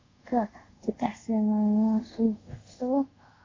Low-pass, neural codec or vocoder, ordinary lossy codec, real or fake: 7.2 kHz; codec, 24 kHz, 0.5 kbps, DualCodec; AAC, 32 kbps; fake